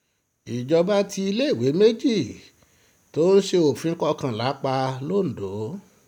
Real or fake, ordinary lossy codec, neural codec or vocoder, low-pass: real; none; none; 19.8 kHz